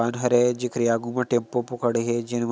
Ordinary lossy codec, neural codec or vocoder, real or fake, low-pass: none; none; real; none